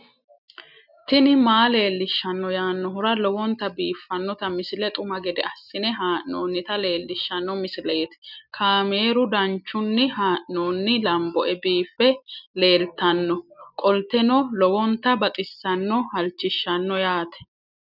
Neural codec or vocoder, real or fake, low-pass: none; real; 5.4 kHz